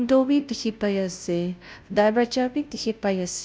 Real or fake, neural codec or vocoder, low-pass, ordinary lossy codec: fake; codec, 16 kHz, 0.5 kbps, FunCodec, trained on Chinese and English, 25 frames a second; none; none